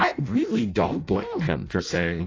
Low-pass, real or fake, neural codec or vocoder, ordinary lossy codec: 7.2 kHz; fake; codec, 16 kHz in and 24 kHz out, 0.6 kbps, FireRedTTS-2 codec; AAC, 32 kbps